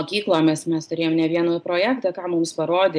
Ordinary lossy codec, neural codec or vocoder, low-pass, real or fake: MP3, 96 kbps; none; 9.9 kHz; real